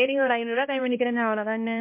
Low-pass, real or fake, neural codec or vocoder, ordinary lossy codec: 3.6 kHz; fake; codec, 16 kHz, 1 kbps, X-Codec, HuBERT features, trained on balanced general audio; MP3, 24 kbps